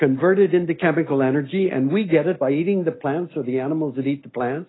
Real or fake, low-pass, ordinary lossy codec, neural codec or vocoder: real; 7.2 kHz; AAC, 16 kbps; none